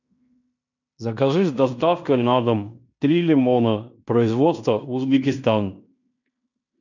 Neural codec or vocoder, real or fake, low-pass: codec, 16 kHz in and 24 kHz out, 0.9 kbps, LongCat-Audio-Codec, fine tuned four codebook decoder; fake; 7.2 kHz